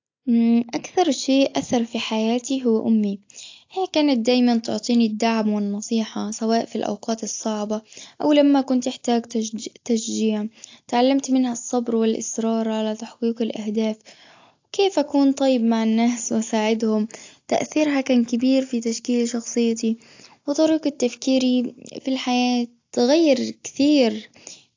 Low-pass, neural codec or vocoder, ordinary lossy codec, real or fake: 7.2 kHz; none; AAC, 48 kbps; real